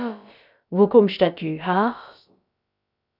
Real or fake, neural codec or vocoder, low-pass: fake; codec, 16 kHz, about 1 kbps, DyCAST, with the encoder's durations; 5.4 kHz